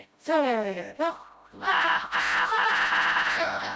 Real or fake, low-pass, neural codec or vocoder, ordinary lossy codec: fake; none; codec, 16 kHz, 0.5 kbps, FreqCodec, smaller model; none